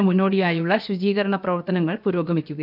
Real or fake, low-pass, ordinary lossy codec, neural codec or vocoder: fake; 5.4 kHz; none; codec, 16 kHz, about 1 kbps, DyCAST, with the encoder's durations